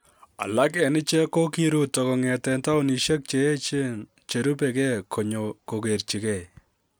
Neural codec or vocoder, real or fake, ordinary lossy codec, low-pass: none; real; none; none